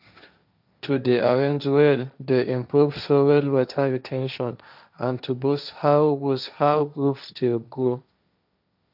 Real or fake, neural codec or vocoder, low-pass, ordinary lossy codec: fake; codec, 16 kHz, 1.1 kbps, Voila-Tokenizer; 5.4 kHz; none